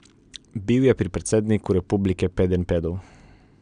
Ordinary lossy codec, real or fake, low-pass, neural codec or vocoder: none; real; 9.9 kHz; none